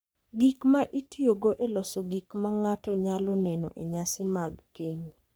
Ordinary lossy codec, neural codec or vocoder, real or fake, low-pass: none; codec, 44.1 kHz, 3.4 kbps, Pupu-Codec; fake; none